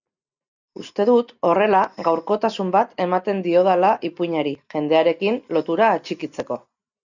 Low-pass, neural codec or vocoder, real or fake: 7.2 kHz; none; real